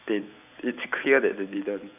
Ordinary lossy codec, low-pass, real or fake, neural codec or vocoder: none; 3.6 kHz; fake; vocoder, 44.1 kHz, 128 mel bands every 512 samples, BigVGAN v2